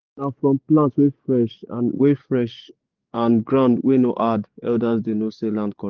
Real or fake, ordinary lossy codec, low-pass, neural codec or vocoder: fake; Opus, 32 kbps; 7.2 kHz; codec, 44.1 kHz, 7.8 kbps, DAC